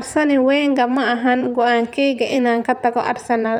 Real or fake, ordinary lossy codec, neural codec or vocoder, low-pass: fake; none; vocoder, 44.1 kHz, 128 mel bands, Pupu-Vocoder; 19.8 kHz